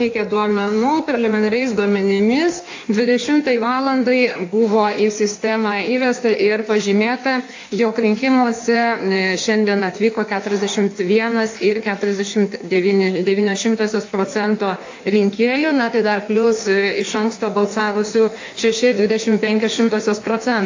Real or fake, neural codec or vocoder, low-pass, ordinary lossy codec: fake; codec, 16 kHz in and 24 kHz out, 1.1 kbps, FireRedTTS-2 codec; 7.2 kHz; AAC, 48 kbps